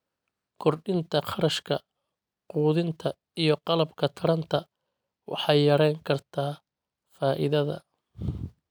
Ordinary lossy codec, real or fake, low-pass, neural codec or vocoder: none; real; none; none